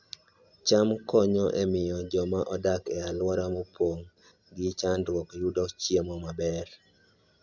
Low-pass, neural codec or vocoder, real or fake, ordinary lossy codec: 7.2 kHz; none; real; none